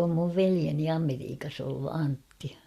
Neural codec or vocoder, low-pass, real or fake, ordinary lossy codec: none; 14.4 kHz; real; Opus, 64 kbps